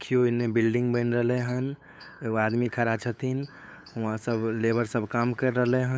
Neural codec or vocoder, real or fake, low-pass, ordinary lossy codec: codec, 16 kHz, 8 kbps, FunCodec, trained on LibriTTS, 25 frames a second; fake; none; none